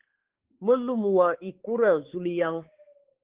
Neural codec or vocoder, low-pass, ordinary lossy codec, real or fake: codec, 16 kHz, 4 kbps, X-Codec, HuBERT features, trained on balanced general audio; 3.6 kHz; Opus, 16 kbps; fake